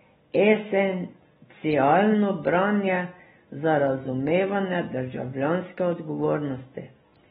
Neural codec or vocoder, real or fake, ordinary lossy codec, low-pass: none; real; AAC, 16 kbps; 14.4 kHz